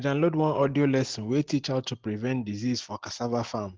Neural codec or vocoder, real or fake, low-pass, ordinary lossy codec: none; real; 7.2 kHz; Opus, 16 kbps